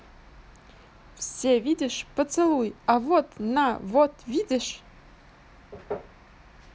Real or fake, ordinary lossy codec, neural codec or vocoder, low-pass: real; none; none; none